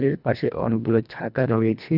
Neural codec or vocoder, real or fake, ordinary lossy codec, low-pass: codec, 24 kHz, 1.5 kbps, HILCodec; fake; none; 5.4 kHz